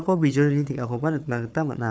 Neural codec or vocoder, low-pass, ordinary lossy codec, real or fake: codec, 16 kHz, 4 kbps, FunCodec, trained on Chinese and English, 50 frames a second; none; none; fake